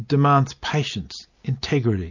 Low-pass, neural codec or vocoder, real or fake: 7.2 kHz; none; real